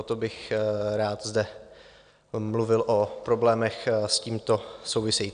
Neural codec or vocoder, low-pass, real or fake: none; 9.9 kHz; real